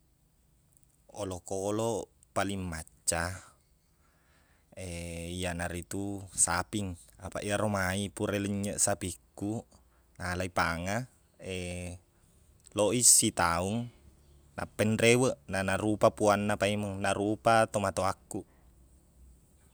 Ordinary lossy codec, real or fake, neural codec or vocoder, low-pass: none; real; none; none